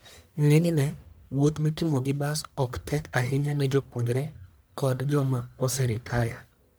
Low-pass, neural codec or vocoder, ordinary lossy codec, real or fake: none; codec, 44.1 kHz, 1.7 kbps, Pupu-Codec; none; fake